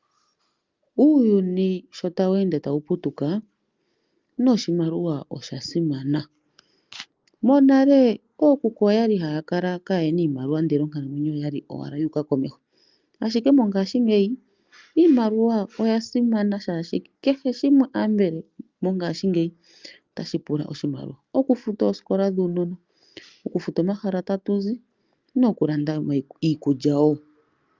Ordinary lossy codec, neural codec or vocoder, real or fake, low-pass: Opus, 24 kbps; none; real; 7.2 kHz